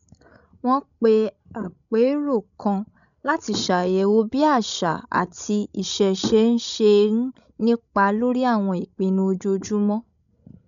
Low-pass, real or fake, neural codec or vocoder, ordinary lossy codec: 7.2 kHz; fake; codec, 16 kHz, 16 kbps, FreqCodec, larger model; none